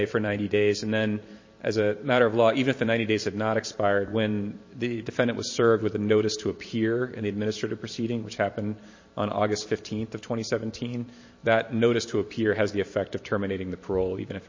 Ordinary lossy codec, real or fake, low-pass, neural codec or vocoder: MP3, 32 kbps; fake; 7.2 kHz; vocoder, 44.1 kHz, 128 mel bands every 512 samples, BigVGAN v2